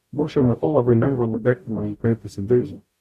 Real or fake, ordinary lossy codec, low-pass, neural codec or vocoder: fake; MP3, 64 kbps; 14.4 kHz; codec, 44.1 kHz, 0.9 kbps, DAC